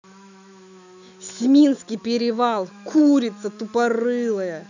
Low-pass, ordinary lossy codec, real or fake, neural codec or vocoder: 7.2 kHz; none; fake; autoencoder, 48 kHz, 128 numbers a frame, DAC-VAE, trained on Japanese speech